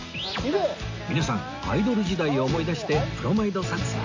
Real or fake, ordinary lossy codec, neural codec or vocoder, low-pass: real; AAC, 48 kbps; none; 7.2 kHz